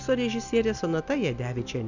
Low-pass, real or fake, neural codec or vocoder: 7.2 kHz; real; none